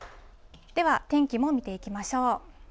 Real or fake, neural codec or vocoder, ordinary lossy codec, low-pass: real; none; none; none